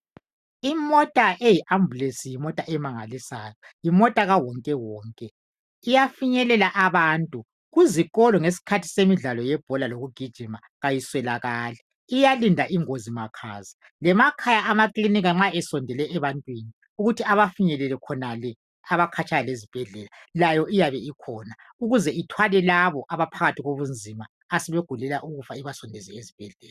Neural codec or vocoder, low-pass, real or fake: none; 14.4 kHz; real